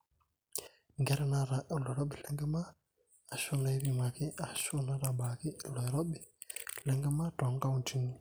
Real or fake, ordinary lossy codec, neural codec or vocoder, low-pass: real; none; none; none